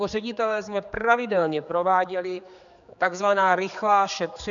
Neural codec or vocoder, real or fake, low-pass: codec, 16 kHz, 4 kbps, X-Codec, HuBERT features, trained on general audio; fake; 7.2 kHz